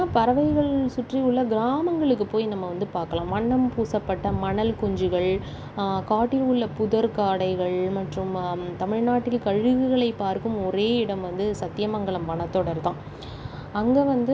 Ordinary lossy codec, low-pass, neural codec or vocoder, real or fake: none; none; none; real